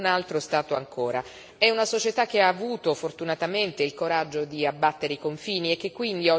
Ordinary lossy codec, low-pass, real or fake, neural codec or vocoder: none; none; real; none